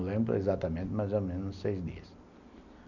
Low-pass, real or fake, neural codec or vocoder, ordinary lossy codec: 7.2 kHz; real; none; none